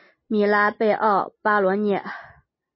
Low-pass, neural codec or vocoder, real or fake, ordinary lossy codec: 7.2 kHz; none; real; MP3, 24 kbps